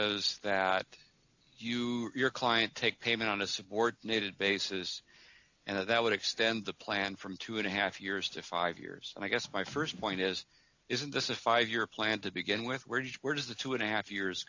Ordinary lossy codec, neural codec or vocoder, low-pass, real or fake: AAC, 48 kbps; none; 7.2 kHz; real